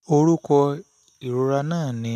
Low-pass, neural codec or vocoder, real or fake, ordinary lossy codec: 14.4 kHz; none; real; none